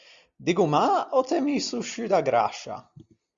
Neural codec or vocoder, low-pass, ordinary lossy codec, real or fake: none; 7.2 kHz; Opus, 64 kbps; real